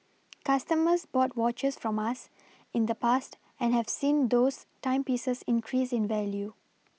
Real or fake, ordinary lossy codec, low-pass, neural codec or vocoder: real; none; none; none